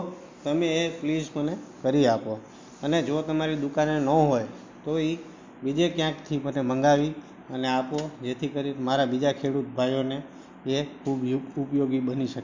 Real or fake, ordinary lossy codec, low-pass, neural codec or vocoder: real; MP3, 48 kbps; 7.2 kHz; none